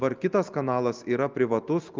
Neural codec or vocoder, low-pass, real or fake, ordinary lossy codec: none; 7.2 kHz; real; Opus, 24 kbps